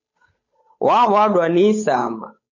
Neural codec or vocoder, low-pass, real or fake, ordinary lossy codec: codec, 16 kHz, 8 kbps, FunCodec, trained on Chinese and English, 25 frames a second; 7.2 kHz; fake; MP3, 32 kbps